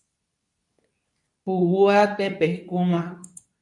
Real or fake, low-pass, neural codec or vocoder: fake; 10.8 kHz; codec, 24 kHz, 0.9 kbps, WavTokenizer, medium speech release version 2